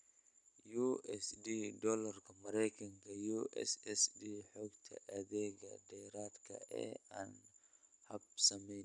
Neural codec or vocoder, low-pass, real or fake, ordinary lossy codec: none; 10.8 kHz; real; none